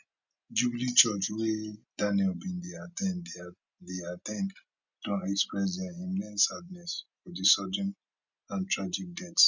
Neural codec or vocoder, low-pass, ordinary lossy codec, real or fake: none; 7.2 kHz; none; real